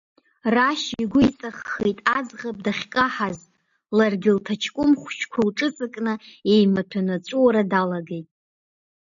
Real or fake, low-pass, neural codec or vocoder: real; 7.2 kHz; none